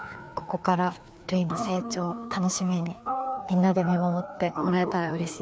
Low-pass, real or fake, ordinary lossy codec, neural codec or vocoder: none; fake; none; codec, 16 kHz, 2 kbps, FreqCodec, larger model